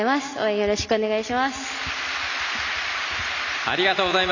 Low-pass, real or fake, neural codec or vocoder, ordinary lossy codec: 7.2 kHz; real; none; none